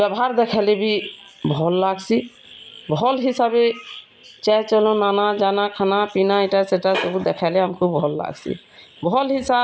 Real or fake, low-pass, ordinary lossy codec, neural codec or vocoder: real; none; none; none